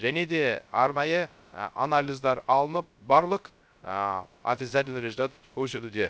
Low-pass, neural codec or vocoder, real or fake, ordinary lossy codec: none; codec, 16 kHz, 0.3 kbps, FocalCodec; fake; none